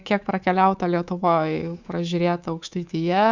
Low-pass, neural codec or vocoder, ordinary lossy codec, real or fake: 7.2 kHz; codec, 24 kHz, 3.1 kbps, DualCodec; Opus, 64 kbps; fake